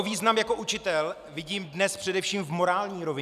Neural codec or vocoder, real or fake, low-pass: none; real; 14.4 kHz